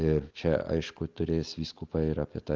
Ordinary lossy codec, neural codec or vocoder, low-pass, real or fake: Opus, 32 kbps; none; 7.2 kHz; real